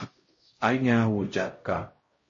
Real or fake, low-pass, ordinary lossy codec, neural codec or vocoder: fake; 7.2 kHz; MP3, 32 kbps; codec, 16 kHz, 0.5 kbps, X-Codec, HuBERT features, trained on LibriSpeech